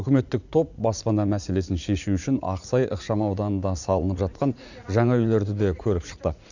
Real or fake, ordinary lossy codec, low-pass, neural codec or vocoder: fake; none; 7.2 kHz; vocoder, 44.1 kHz, 80 mel bands, Vocos